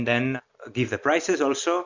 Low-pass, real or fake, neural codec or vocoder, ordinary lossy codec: 7.2 kHz; real; none; MP3, 48 kbps